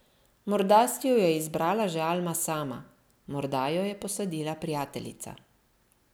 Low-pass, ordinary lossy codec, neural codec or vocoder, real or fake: none; none; none; real